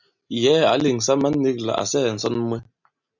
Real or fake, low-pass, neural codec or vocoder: real; 7.2 kHz; none